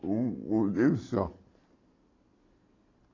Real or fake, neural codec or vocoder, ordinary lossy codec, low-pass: real; none; AAC, 32 kbps; 7.2 kHz